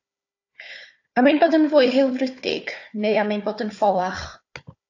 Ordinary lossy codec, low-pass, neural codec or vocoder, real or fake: AAC, 48 kbps; 7.2 kHz; codec, 16 kHz, 4 kbps, FunCodec, trained on Chinese and English, 50 frames a second; fake